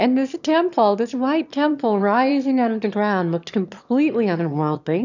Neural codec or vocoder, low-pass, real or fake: autoencoder, 22.05 kHz, a latent of 192 numbers a frame, VITS, trained on one speaker; 7.2 kHz; fake